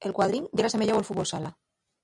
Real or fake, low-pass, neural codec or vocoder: real; 10.8 kHz; none